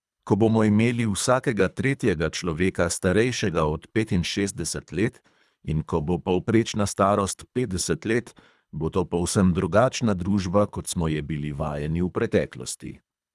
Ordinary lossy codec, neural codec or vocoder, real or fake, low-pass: none; codec, 24 kHz, 3 kbps, HILCodec; fake; none